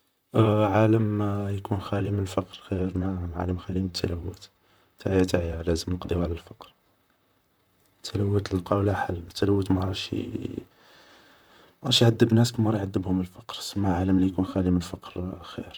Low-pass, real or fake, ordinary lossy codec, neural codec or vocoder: none; fake; none; vocoder, 44.1 kHz, 128 mel bands, Pupu-Vocoder